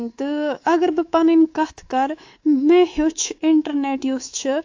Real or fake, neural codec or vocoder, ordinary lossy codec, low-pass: fake; codec, 24 kHz, 3.1 kbps, DualCodec; AAC, 48 kbps; 7.2 kHz